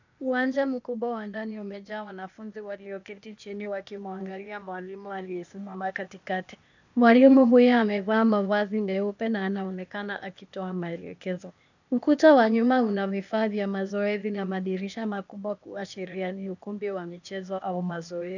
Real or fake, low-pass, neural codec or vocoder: fake; 7.2 kHz; codec, 16 kHz, 0.8 kbps, ZipCodec